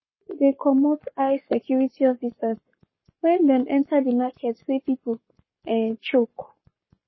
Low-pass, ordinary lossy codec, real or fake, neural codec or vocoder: 7.2 kHz; MP3, 24 kbps; fake; codec, 44.1 kHz, 7.8 kbps, Pupu-Codec